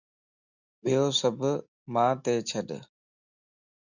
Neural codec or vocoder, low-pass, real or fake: none; 7.2 kHz; real